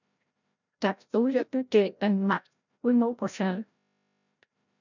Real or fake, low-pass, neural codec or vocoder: fake; 7.2 kHz; codec, 16 kHz, 0.5 kbps, FreqCodec, larger model